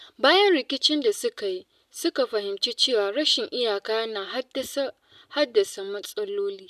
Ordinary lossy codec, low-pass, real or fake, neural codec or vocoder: none; 14.4 kHz; real; none